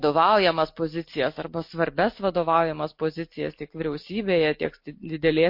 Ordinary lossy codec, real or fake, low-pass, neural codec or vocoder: MP3, 32 kbps; real; 5.4 kHz; none